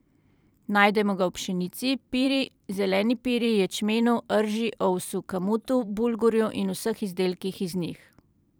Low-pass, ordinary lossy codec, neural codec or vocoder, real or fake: none; none; vocoder, 44.1 kHz, 128 mel bands every 512 samples, BigVGAN v2; fake